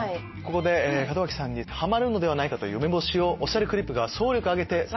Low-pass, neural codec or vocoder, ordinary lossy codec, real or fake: 7.2 kHz; none; MP3, 24 kbps; real